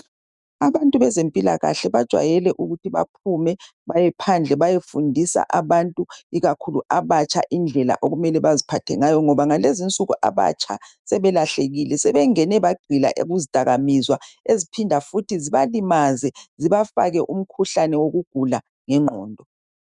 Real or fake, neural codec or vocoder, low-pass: fake; autoencoder, 48 kHz, 128 numbers a frame, DAC-VAE, trained on Japanese speech; 10.8 kHz